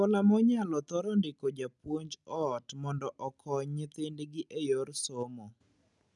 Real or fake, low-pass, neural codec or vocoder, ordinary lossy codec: real; 10.8 kHz; none; none